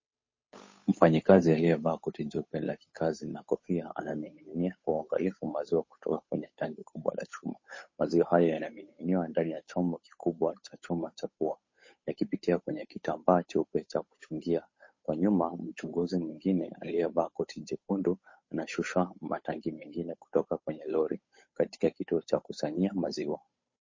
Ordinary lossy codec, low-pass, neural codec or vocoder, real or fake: MP3, 32 kbps; 7.2 kHz; codec, 16 kHz, 8 kbps, FunCodec, trained on Chinese and English, 25 frames a second; fake